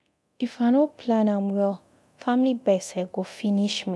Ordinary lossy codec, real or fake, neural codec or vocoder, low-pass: none; fake; codec, 24 kHz, 0.9 kbps, DualCodec; none